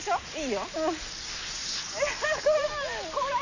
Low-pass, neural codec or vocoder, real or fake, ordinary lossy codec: 7.2 kHz; none; real; none